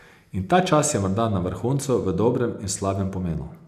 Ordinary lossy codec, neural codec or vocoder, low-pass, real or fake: none; none; 14.4 kHz; real